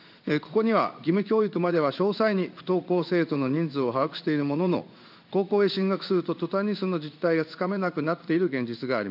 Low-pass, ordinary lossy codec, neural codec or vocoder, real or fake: 5.4 kHz; none; codec, 16 kHz in and 24 kHz out, 1 kbps, XY-Tokenizer; fake